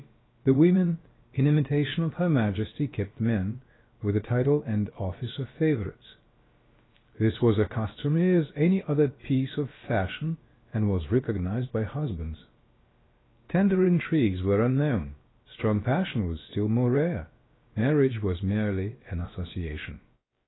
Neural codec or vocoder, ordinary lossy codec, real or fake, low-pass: codec, 16 kHz, about 1 kbps, DyCAST, with the encoder's durations; AAC, 16 kbps; fake; 7.2 kHz